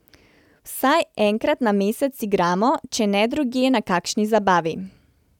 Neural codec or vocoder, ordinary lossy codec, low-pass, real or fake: none; none; 19.8 kHz; real